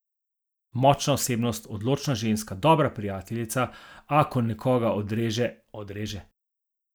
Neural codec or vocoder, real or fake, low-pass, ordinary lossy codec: none; real; none; none